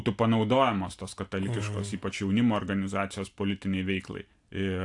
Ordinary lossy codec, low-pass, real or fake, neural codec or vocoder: AAC, 64 kbps; 10.8 kHz; real; none